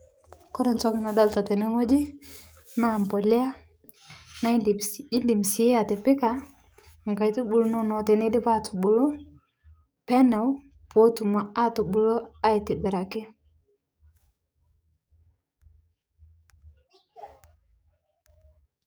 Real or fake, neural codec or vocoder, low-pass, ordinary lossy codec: fake; codec, 44.1 kHz, 7.8 kbps, DAC; none; none